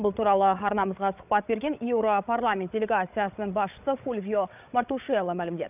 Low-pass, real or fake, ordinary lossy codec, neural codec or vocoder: 3.6 kHz; fake; none; codec, 16 kHz, 8 kbps, FreqCodec, larger model